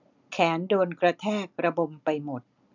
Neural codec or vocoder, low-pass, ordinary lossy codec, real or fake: none; 7.2 kHz; none; real